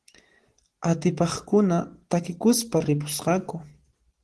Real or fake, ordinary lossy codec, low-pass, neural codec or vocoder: real; Opus, 16 kbps; 10.8 kHz; none